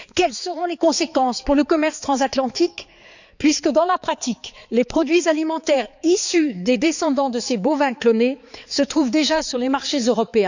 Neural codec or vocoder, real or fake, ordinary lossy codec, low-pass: codec, 16 kHz, 4 kbps, X-Codec, HuBERT features, trained on balanced general audio; fake; none; 7.2 kHz